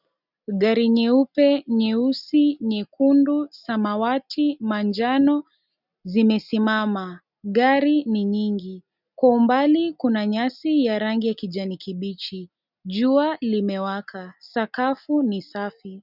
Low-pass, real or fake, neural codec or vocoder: 5.4 kHz; real; none